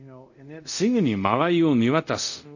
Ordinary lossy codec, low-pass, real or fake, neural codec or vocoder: none; 7.2 kHz; fake; codec, 24 kHz, 0.5 kbps, DualCodec